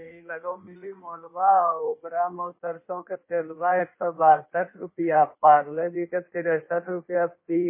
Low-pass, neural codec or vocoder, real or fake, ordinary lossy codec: 3.6 kHz; codec, 16 kHz in and 24 kHz out, 1.1 kbps, FireRedTTS-2 codec; fake; MP3, 24 kbps